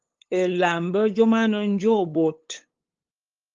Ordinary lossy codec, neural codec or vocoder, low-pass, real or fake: Opus, 32 kbps; codec, 16 kHz, 8 kbps, FunCodec, trained on LibriTTS, 25 frames a second; 7.2 kHz; fake